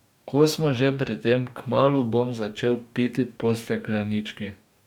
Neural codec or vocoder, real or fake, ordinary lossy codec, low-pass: codec, 44.1 kHz, 2.6 kbps, DAC; fake; none; 19.8 kHz